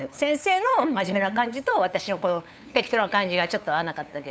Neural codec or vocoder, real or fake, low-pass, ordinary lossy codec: codec, 16 kHz, 8 kbps, FunCodec, trained on LibriTTS, 25 frames a second; fake; none; none